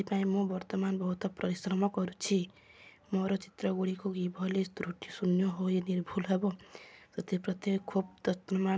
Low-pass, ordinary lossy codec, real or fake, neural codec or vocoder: none; none; real; none